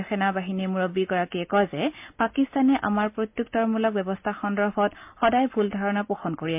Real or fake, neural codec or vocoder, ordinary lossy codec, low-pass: real; none; MP3, 32 kbps; 3.6 kHz